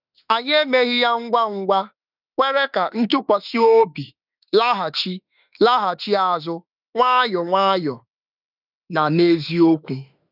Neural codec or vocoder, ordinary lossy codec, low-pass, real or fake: autoencoder, 48 kHz, 32 numbers a frame, DAC-VAE, trained on Japanese speech; none; 5.4 kHz; fake